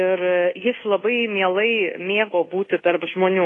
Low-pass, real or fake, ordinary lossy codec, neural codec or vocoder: 10.8 kHz; fake; AAC, 32 kbps; codec, 24 kHz, 1.2 kbps, DualCodec